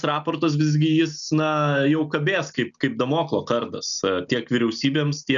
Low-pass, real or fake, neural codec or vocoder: 7.2 kHz; real; none